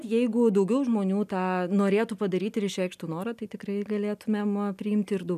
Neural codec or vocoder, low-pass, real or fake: none; 14.4 kHz; real